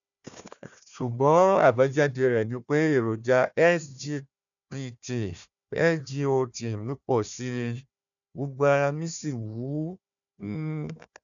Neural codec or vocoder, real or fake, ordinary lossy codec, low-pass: codec, 16 kHz, 1 kbps, FunCodec, trained on Chinese and English, 50 frames a second; fake; none; 7.2 kHz